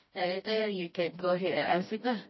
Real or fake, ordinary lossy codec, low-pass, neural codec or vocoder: fake; MP3, 24 kbps; 7.2 kHz; codec, 16 kHz, 1 kbps, FreqCodec, smaller model